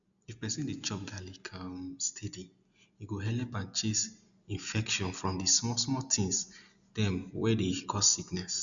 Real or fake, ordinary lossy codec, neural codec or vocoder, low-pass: real; none; none; 7.2 kHz